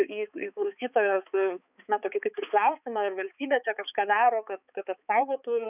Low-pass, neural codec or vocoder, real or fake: 3.6 kHz; codec, 16 kHz, 4 kbps, X-Codec, HuBERT features, trained on balanced general audio; fake